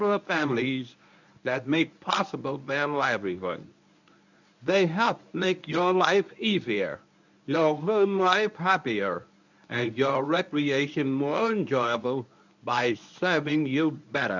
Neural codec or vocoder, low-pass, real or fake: codec, 24 kHz, 0.9 kbps, WavTokenizer, medium speech release version 1; 7.2 kHz; fake